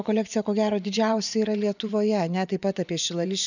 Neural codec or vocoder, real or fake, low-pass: vocoder, 24 kHz, 100 mel bands, Vocos; fake; 7.2 kHz